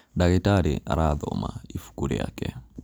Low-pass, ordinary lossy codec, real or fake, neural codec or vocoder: none; none; real; none